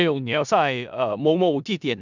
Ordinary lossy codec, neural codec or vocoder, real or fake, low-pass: none; codec, 16 kHz in and 24 kHz out, 0.4 kbps, LongCat-Audio-Codec, four codebook decoder; fake; 7.2 kHz